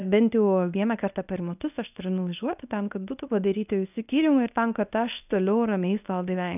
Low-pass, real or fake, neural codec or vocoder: 3.6 kHz; fake; codec, 24 kHz, 0.9 kbps, WavTokenizer, medium speech release version 1